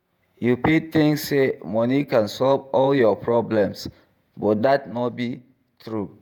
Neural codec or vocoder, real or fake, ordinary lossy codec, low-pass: vocoder, 48 kHz, 128 mel bands, Vocos; fake; none; 19.8 kHz